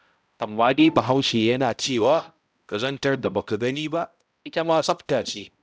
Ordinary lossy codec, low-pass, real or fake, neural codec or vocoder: none; none; fake; codec, 16 kHz, 0.5 kbps, X-Codec, HuBERT features, trained on balanced general audio